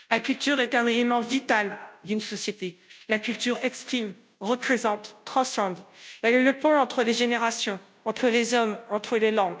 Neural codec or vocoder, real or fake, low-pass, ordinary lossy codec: codec, 16 kHz, 0.5 kbps, FunCodec, trained on Chinese and English, 25 frames a second; fake; none; none